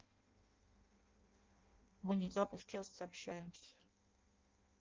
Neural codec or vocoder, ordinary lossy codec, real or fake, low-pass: codec, 16 kHz in and 24 kHz out, 0.6 kbps, FireRedTTS-2 codec; Opus, 32 kbps; fake; 7.2 kHz